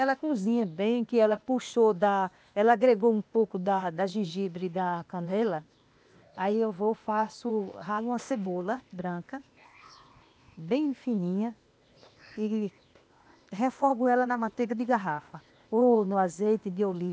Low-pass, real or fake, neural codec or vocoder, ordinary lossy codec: none; fake; codec, 16 kHz, 0.8 kbps, ZipCodec; none